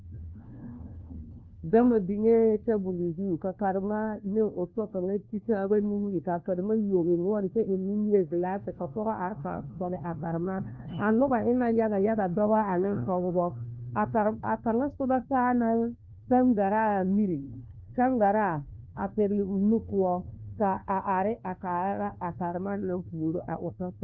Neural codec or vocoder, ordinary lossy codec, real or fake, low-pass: codec, 16 kHz, 1 kbps, FunCodec, trained on LibriTTS, 50 frames a second; Opus, 24 kbps; fake; 7.2 kHz